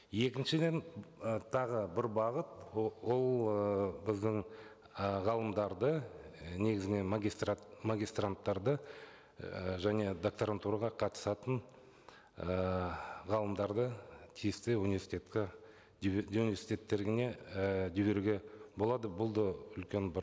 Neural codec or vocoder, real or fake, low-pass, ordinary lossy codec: none; real; none; none